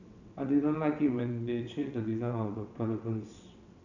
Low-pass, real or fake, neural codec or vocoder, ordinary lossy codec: 7.2 kHz; fake; vocoder, 22.05 kHz, 80 mel bands, WaveNeXt; none